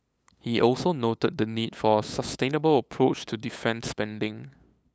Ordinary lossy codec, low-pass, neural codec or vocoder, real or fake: none; none; codec, 16 kHz, 8 kbps, FunCodec, trained on LibriTTS, 25 frames a second; fake